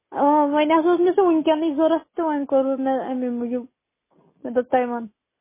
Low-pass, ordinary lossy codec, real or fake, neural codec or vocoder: 3.6 kHz; MP3, 16 kbps; real; none